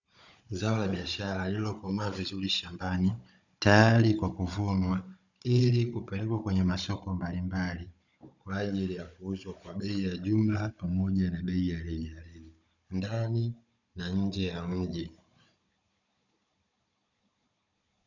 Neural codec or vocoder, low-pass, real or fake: codec, 16 kHz, 16 kbps, FunCodec, trained on Chinese and English, 50 frames a second; 7.2 kHz; fake